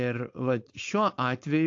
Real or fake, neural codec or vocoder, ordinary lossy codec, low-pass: fake; codec, 16 kHz, 4.8 kbps, FACodec; AAC, 48 kbps; 7.2 kHz